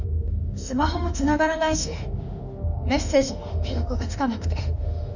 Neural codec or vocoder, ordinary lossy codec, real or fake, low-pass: autoencoder, 48 kHz, 32 numbers a frame, DAC-VAE, trained on Japanese speech; none; fake; 7.2 kHz